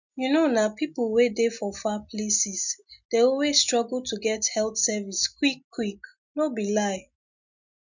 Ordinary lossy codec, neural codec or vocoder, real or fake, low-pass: none; none; real; 7.2 kHz